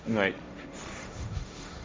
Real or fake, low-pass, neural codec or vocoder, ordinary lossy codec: fake; none; codec, 16 kHz, 1.1 kbps, Voila-Tokenizer; none